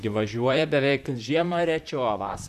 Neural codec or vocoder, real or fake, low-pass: vocoder, 44.1 kHz, 128 mel bands, Pupu-Vocoder; fake; 14.4 kHz